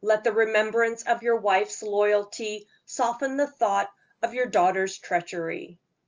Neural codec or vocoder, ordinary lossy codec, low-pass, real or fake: none; Opus, 24 kbps; 7.2 kHz; real